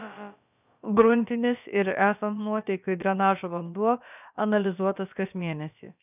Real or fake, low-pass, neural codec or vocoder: fake; 3.6 kHz; codec, 16 kHz, about 1 kbps, DyCAST, with the encoder's durations